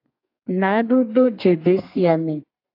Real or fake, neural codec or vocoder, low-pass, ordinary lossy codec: fake; codec, 32 kHz, 1.9 kbps, SNAC; 5.4 kHz; AAC, 32 kbps